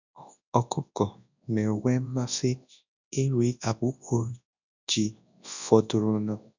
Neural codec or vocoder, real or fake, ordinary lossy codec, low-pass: codec, 24 kHz, 0.9 kbps, WavTokenizer, large speech release; fake; none; 7.2 kHz